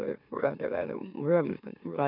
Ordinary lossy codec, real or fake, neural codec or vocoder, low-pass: none; fake; autoencoder, 44.1 kHz, a latent of 192 numbers a frame, MeloTTS; 5.4 kHz